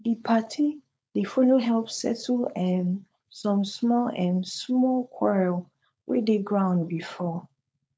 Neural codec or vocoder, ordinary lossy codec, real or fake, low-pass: codec, 16 kHz, 4.8 kbps, FACodec; none; fake; none